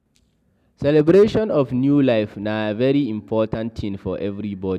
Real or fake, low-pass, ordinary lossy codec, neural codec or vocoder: real; 14.4 kHz; none; none